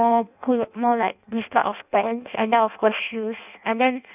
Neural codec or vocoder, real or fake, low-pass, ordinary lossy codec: codec, 16 kHz in and 24 kHz out, 0.6 kbps, FireRedTTS-2 codec; fake; 3.6 kHz; none